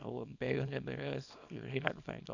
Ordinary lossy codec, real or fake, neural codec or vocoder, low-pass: none; fake; codec, 24 kHz, 0.9 kbps, WavTokenizer, small release; 7.2 kHz